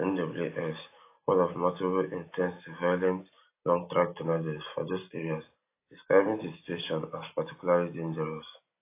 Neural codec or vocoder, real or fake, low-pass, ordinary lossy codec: none; real; 3.6 kHz; AAC, 24 kbps